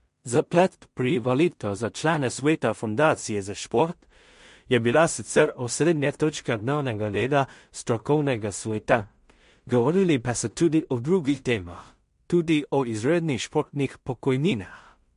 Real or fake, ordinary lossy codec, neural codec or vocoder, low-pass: fake; MP3, 48 kbps; codec, 16 kHz in and 24 kHz out, 0.4 kbps, LongCat-Audio-Codec, two codebook decoder; 10.8 kHz